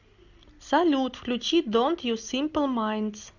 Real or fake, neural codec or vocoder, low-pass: real; none; 7.2 kHz